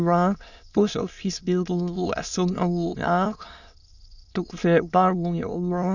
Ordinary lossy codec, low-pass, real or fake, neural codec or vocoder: none; 7.2 kHz; fake; autoencoder, 22.05 kHz, a latent of 192 numbers a frame, VITS, trained on many speakers